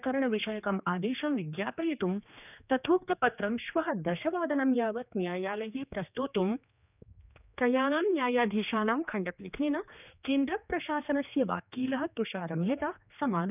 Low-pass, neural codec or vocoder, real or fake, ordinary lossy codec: 3.6 kHz; codec, 16 kHz, 2 kbps, X-Codec, HuBERT features, trained on general audio; fake; none